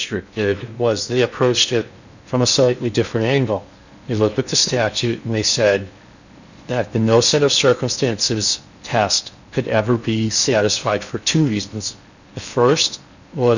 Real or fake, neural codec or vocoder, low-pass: fake; codec, 16 kHz in and 24 kHz out, 0.8 kbps, FocalCodec, streaming, 65536 codes; 7.2 kHz